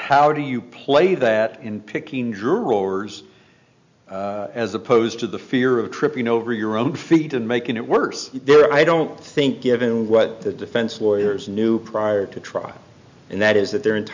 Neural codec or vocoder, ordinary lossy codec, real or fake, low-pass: none; MP3, 64 kbps; real; 7.2 kHz